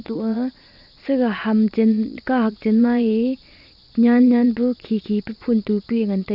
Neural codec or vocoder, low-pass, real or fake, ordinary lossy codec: vocoder, 22.05 kHz, 80 mel bands, Vocos; 5.4 kHz; fake; AAC, 48 kbps